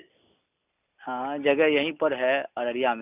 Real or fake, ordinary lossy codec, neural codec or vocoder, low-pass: real; none; none; 3.6 kHz